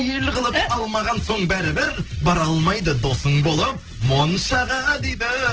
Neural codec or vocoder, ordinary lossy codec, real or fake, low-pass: none; Opus, 16 kbps; real; 7.2 kHz